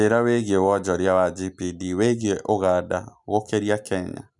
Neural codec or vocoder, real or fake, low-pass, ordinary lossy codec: none; real; 10.8 kHz; none